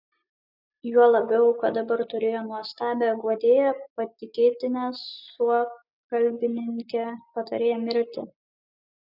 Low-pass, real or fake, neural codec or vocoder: 5.4 kHz; real; none